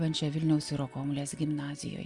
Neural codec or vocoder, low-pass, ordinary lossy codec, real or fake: none; 10.8 kHz; Opus, 64 kbps; real